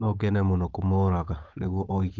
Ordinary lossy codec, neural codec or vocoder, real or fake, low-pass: Opus, 24 kbps; codec, 16 kHz, 8 kbps, FunCodec, trained on Chinese and English, 25 frames a second; fake; 7.2 kHz